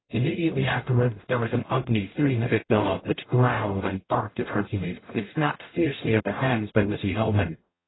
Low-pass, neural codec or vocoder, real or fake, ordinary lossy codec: 7.2 kHz; codec, 44.1 kHz, 0.9 kbps, DAC; fake; AAC, 16 kbps